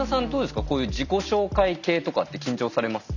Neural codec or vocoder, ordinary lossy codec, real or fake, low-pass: none; none; real; 7.2 kHz